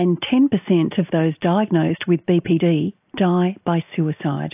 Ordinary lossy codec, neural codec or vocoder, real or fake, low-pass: AAC, 32 kbps; none; real; 3.6 kHz